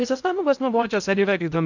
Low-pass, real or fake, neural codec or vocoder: 7.2 kHz; fake; codec, 16 kHz in and 24 kHz out, 0.6 kbps, FocalCodec, streaming, 2048 codes